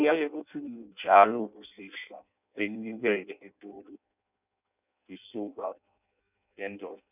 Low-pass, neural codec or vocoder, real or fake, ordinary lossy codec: 3.6 kHz; codec, 16 kHz in and 24 kHz out, 0.6 kbps, FireRedTTS-2 codec; fake; none